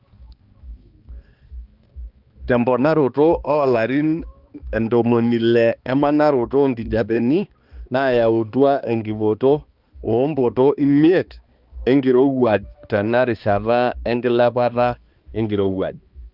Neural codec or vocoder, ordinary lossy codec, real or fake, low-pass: codec, 16 kHz, 2 kbps, X-Codec, HuBERT features, trained on balanced general audio; Opus, 24 kbps; fake; 5.4 kHz